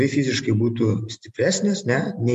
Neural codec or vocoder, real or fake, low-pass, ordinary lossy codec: none; real; 14.4 kHz; MP3, 64 kbps